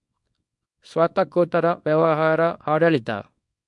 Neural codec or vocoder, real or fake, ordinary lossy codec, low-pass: codec, 24 kHz, 0.9 kbps, WavTokenizer, small release; fake; MP3, 64 kbps; 10.8 kHz